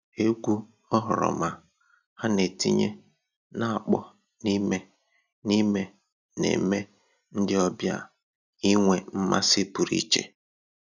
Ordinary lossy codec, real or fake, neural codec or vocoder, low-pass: none; real; none; 7.2 kHz